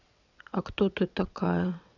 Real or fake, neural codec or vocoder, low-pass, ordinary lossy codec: fake; vocoder, 22.05 kHz, 80 mel bands, WaveNeXt; 7.2 kHz; none